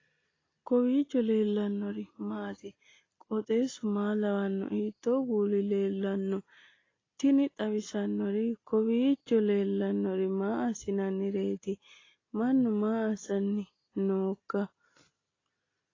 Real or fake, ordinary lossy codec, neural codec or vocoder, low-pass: real; AAC, 32 kbps; none; 7.2 kHz